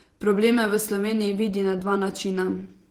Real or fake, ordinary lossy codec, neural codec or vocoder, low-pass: fake; Opus, 16 kbps; vocoder, 48 kHz, 128 mel bands, Vocos; 19.8 kHz